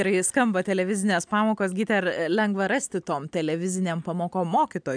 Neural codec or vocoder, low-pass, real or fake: none; 9.9 kHz; real